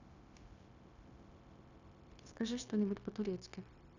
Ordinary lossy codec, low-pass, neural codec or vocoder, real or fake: none; 7.2 kHz; codec, 16 kHz, 0.9 kbps, LongCat-Audio-Codec; fake